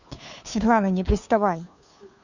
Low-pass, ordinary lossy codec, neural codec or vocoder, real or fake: 7.2 kHz; none; codec, 16 kHz, 2 kbps, FunCodec, trained on Chinese and English, 25 frames a second; fake